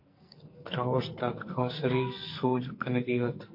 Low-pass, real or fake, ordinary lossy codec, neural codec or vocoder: 5.4 kHz; fake; MP3, 32 kbps; codec, 44.1 kHz, 2.6 kbps, SNAC